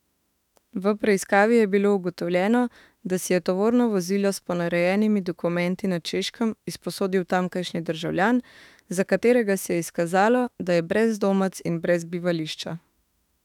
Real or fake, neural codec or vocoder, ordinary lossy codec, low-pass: fake; autoencoder, 48 kHz, 32 numbers a frame, DAC-VAE, trained on Japanese speech; none; 19.8 kHz